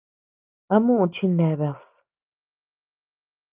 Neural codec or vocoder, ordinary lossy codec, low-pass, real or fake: none; Opus, 24 kbps; 3.6 kHz; real